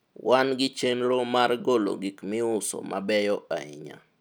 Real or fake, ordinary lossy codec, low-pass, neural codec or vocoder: real; none; none; none